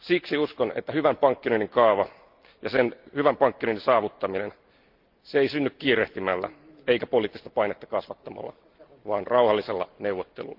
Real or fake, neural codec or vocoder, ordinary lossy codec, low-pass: real; none; Opus, 32 kbps; 5.4 kHz